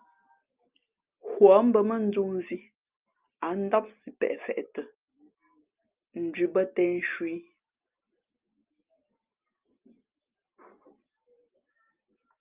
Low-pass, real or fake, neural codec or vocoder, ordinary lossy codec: 3.6 kHz; real; none; Opus, 24 kbps